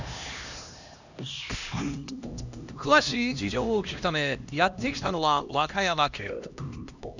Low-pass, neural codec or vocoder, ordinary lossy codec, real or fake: 7.2 kHz; codec, 16 kHz, 1 kbps, X-Codec, HuBERT features, trained on LibriSpeech; none; fake